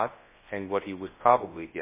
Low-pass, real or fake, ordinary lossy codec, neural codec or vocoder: 3.6 kHz; fake; MP3, 16 kbps; codec, 24 kHz, 0.9 kbps, WavTokenizer, large speech release